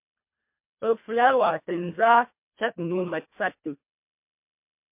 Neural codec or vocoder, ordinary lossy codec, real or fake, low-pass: codec, 24 kHz, 1.5 kbps, HILCodec; MP3, 32 kbps; fake; 3.6 kHz